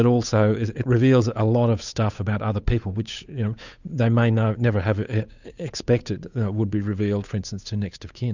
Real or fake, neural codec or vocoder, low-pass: fake; codec, 16 kHz, 8 kbps, FunCodec, trained on Chinese and English, 25 frames a second; 7.2 kHz